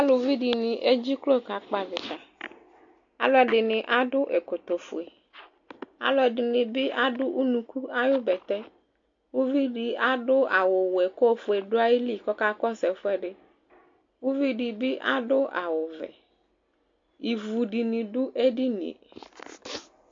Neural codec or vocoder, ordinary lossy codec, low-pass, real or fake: none; AAC, 48 kbps; 7.2 kHz; real